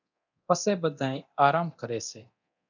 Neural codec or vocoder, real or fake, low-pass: codec, 24 kHz, 0.9 kbps, DualCodec; fake; 7.2 kHz